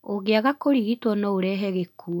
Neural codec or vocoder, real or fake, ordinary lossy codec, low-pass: none; real; none; 19.8 kHz